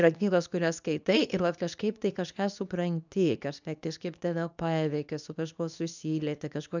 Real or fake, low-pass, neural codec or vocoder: fake; 7.2 kHz; codec, 24 kHz, 0.9 kbps, WavTokenizer, medium speech release version 2